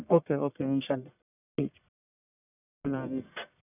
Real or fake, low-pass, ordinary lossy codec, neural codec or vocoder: fake; 3.6 kHz; none; codec, 44.1 kHz, 1.7 kbps, Pupu-Codec